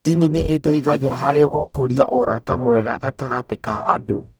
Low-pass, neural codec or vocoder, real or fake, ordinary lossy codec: none; codec, 44.1 kHz, 0.9 kbps, DAC; fake; none